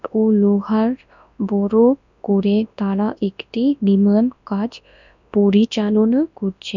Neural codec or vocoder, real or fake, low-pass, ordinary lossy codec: codec, 24 kHz, 0.9 kbps, WavTokenizer, large speech release; fake; 7.2 kHz; none